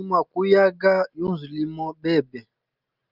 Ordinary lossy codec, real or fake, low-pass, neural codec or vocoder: Opus, 24 kbps; real; 5.4 kHz; none